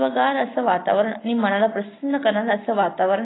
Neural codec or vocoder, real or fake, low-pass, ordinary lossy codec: none; real; 7.2 kHz; AAC, 16 kbps